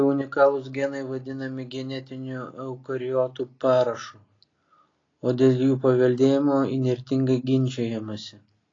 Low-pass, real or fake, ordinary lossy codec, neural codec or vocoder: 7.2 kHz; real; AAC, 32 kbps; none